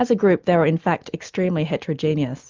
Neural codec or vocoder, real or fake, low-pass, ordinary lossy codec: none; real; 7.2 kHz; Opus, 24 kbps